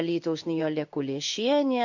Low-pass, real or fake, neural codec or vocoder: 7.2 kHz; fake; codec, 16 kHz in and 24 kHz out, 1 kbps, XY-Tokenizer